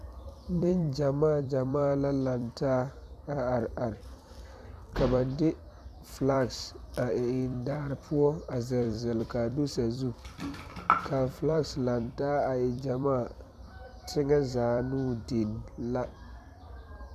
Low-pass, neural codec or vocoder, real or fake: 14.4 kHz; vocoder, 44.1 kHz, 128 mel bands every 256 samples, BigVGAN v2; fake